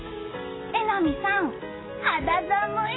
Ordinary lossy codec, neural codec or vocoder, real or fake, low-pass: AAC, 16 kbps; none; real; 7.2 kHz